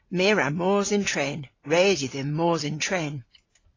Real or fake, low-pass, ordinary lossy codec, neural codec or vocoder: fake; 7.2 kHz; AAC, 32 kbps; vocoder, 44.1 kHz, 128 mel bands every 512 samples, BigVGAN v2